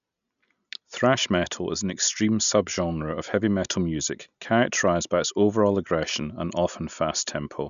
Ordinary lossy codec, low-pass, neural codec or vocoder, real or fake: none; 7.2 kHz; none; real